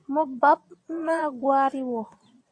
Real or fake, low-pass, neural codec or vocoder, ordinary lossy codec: fake; 9.9 kHz; vocoder, 22.05 kHz, 80 mel bands, Vocos; AAC, 48 kbps